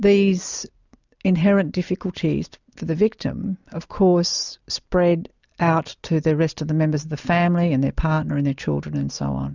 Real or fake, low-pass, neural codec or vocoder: real; 7.2 kHz; none